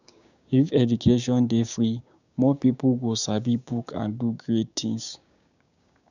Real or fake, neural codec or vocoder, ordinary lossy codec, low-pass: fake; codec, 16 kHz, 6 kbps, DAC; none; 7.2 kHz